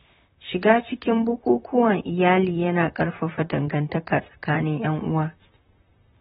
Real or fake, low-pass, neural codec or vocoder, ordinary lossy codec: fake; 19.8 kHz; vocoder, 44.1 kHz, 128 mel bands, Pupu-Vocoder; AAC, 16 kbps